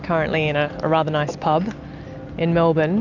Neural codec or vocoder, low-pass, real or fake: none; 7.2 kHz; real